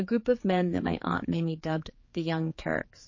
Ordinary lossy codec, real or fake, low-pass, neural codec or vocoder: MP3, 32 kbps; fake; 7.2 kHz; codec, 16 kHz, 4 kbps, X-Codec, HuBERT features, trained on general audio